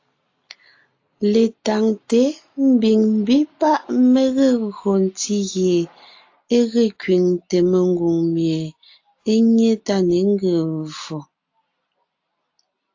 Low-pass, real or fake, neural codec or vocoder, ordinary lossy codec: 7.2 kHz; real; none; AAC, 48 kbps